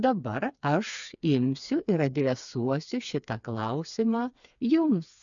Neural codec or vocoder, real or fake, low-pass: codec, 16 kHz, 4 kbps, FreqCodec, smaller model; fake; 7.2 kHz